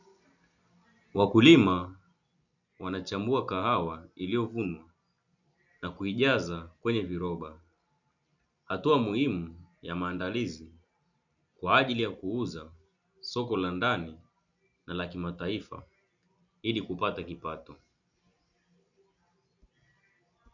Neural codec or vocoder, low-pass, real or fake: none; 7.2 kHz; real